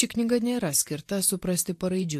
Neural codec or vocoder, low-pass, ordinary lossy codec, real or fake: vocoder, 44.1 kHz, 128 mel bands every 512 samples, BigVGAN v2; 14.4 kHz; AAC, 64 kbps; fake